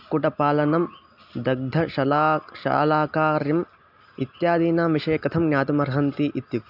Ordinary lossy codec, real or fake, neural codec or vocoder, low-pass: AAC, 48 kbps; real; none; 5.4 kHz